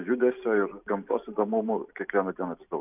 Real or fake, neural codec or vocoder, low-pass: real; none; 3.6 kHz